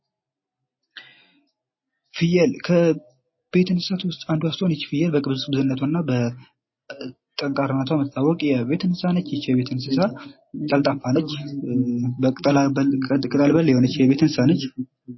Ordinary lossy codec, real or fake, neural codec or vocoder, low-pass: MP3, 24 kbps; real; none; 7.2 kHz